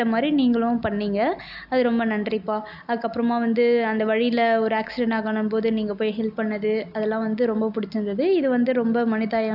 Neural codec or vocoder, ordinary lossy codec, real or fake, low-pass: none; AAC, 48 kbps; real; 5.4 kHz